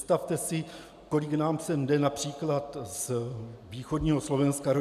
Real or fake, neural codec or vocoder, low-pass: real; none; 14.4 kHz